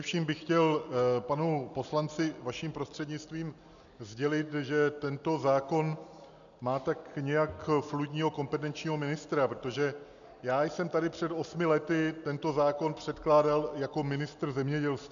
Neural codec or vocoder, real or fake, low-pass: none; real; 7.2 kHz